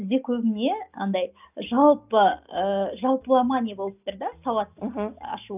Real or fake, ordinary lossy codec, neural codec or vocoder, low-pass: real; none; none; 3.6 kHz